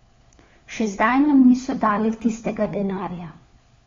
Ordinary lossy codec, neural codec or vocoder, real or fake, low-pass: AAC, 32 kbps; codec, 16 kHz, 4 kbps, FunCodec, trained on LibriTTS, 50 frames a second; fake; 7.2 kHz